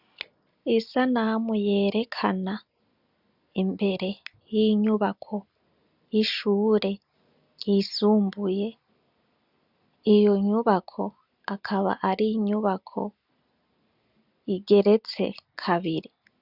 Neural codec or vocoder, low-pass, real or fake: none; 5.4 kHz; real